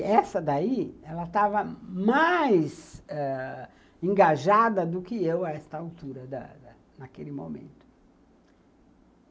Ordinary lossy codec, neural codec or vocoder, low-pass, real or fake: none; none; none; real